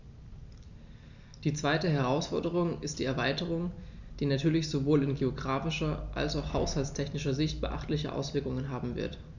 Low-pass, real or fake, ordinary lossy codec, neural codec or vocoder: 7.2 kHz; real; none; none